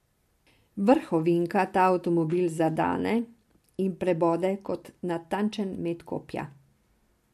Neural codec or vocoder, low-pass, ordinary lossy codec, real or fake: none; 14.4 kHz; MP3, 64 kbps; real